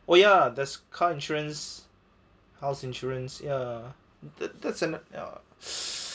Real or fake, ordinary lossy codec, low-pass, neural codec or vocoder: real; none; none; none